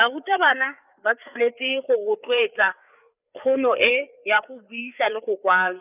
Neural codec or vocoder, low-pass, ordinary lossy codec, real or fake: codec, 16 kHz, 4 kbps, FreqCodec, larger model; 3.6 kHz; none; fake